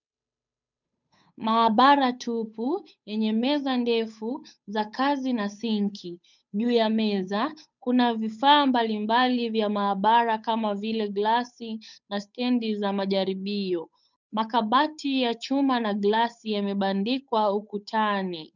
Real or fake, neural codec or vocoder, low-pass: fake; codec, 16 kHz, 8 kbps, FunCodec, trained on Chinese and English, 25 frames a second; 7.2 kHz